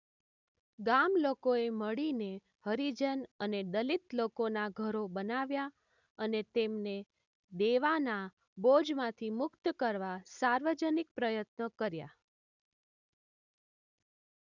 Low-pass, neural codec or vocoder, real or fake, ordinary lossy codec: 7.2 kHz; none; real; none